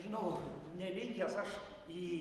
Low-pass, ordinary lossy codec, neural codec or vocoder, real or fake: 14.4 kHz; Opus, 24 kbps; none; real